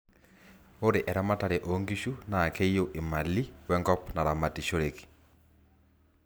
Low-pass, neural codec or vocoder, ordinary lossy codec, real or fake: none; none; none; real